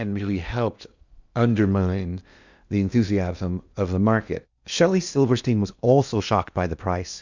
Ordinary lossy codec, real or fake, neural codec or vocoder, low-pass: Opus, 64 kbps; fake; codec, 16 kHz in and 24 kHz out, 0.8 kbps, FocalCodec, streaming, 65536 codes; 7.2 kHz